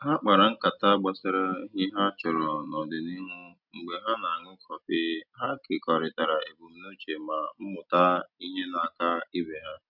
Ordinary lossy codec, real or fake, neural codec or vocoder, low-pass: none; real; none; 5.4 kHz